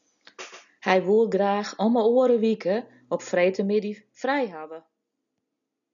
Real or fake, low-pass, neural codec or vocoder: real; 7.2 kHz; none